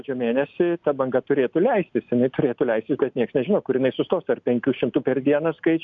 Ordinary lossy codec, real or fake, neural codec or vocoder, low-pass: MP3, 96 kbps; real; none; 7.2 kHz